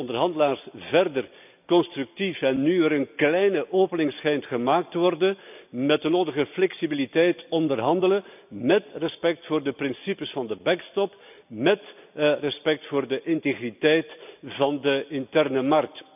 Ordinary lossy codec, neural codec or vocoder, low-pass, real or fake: none; none; 3.6 kHz; real